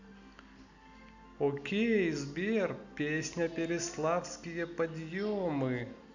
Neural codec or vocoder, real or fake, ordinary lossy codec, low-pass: none; real; none; 7.2 kHz